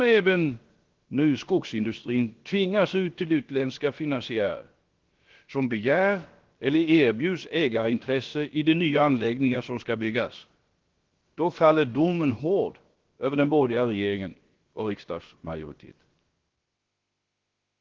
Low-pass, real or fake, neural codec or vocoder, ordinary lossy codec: 7.2 kHz; fake; codec, 16 kHz, about 1 kbps, DyCAST, with the encoder's durations; Opus, 16 kbps